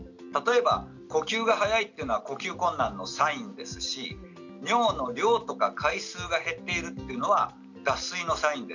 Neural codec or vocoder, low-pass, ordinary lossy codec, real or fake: none; 7.2 kHz; none; real